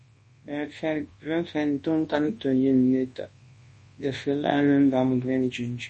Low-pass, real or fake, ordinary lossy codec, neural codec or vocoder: 10.8 kHz; fake; MP3, 32 kbps; codec, 24 kHz, 0.9 kbps, WavTokenizer, large speech release